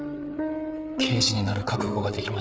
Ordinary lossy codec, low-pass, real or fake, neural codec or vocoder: none; none; fake; codec, 16 kHz, 8 kbps, FreqCodec, larger model